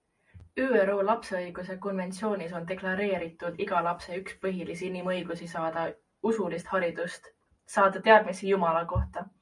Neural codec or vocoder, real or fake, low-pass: none; real; 10.8 kHz